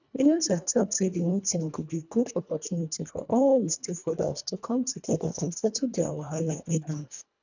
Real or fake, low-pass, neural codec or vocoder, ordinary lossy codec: fake; 7.2 kHz; codec, 24 kHz, 1.5 kbps, HILCodec; none